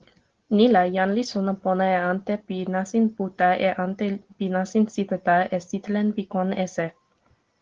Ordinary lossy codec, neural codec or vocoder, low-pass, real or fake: Opus, 16 kbps; none; 7.2 kHz; real